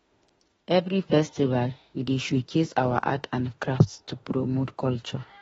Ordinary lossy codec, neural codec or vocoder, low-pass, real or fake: AAC, 24 kbps; autoencoder, 48 kHz, 32 numbers a frame, DAC-VAE, trained on Japanese speech; 19.8 kHz; fake